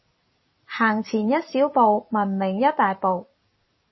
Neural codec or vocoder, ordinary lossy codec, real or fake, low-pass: vocoder, 44.1 kHz, 128 mel bands every 256 samples, BigVGAN v2; MP3, 24 kbps; fake; 7.2 kHz